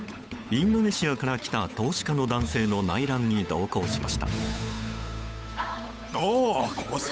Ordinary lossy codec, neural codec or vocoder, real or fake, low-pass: none; codec, 16 kHz, 8 kbps, FunCodec, trained on Chinese and English, 25 frames a second; fake; none